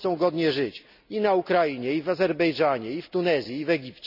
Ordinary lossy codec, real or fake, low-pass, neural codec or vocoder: none; real; 5.4 kHz; none